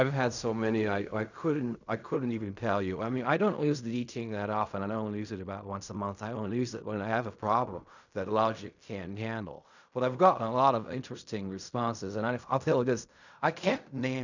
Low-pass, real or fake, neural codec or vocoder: 7.2 kHz; fake; codec, 16 kHz in and 24 kHz out, 0.4 kbps, LongCat-Audio-Codec, fine tuned four codebook decoder